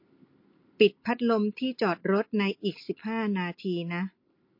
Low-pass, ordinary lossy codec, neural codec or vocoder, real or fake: 5.4 kHz; MP3, 32 kbps; none; real